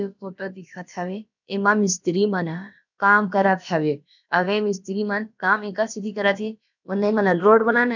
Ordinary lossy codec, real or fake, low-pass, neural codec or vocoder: none; fake; 7.2 kHz; codec, 16 kHz, about 1 kbps, DyCAST, with the encoder's durations